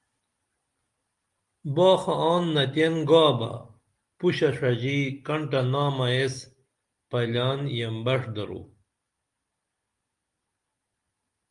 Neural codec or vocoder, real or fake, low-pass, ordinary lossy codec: none; real; 10.8 kHz; Opus, 32 kbps